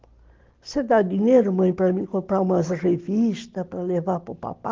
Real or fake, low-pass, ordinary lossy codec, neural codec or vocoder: real; 7.2 kHz; Opus, 16 kbps; none